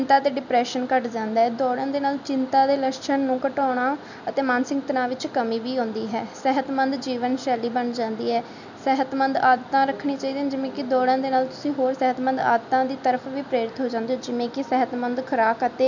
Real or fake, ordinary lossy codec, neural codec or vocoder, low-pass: real; none; none; 7.2 kHz